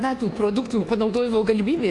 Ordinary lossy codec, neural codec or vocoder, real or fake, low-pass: AAC, 48 kbps; codec, 24 kHz, 1.2 kbps, DualCodec; fake; 10.8 kHz